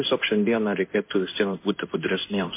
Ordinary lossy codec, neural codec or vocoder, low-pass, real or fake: MP3, 24 kbps; codec, 16 kHz in and 24 kHz out, 1 kbps, XY-Tokenizer; 3.6 kHz; fake